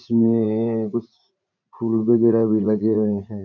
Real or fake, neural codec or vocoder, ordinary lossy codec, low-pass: fake; vocoder, 44.1 kHz, 80 mel bands, Vocos; none; 7.2 kHz